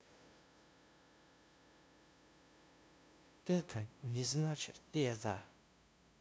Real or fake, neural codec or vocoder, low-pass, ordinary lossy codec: fake; codec, 16 kHz, 0.5 kbps, FunCodec, trained on LibriTTS, 25 frames a second; none; none